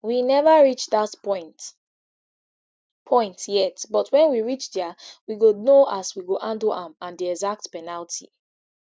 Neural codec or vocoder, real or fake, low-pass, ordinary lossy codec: none; real; none; none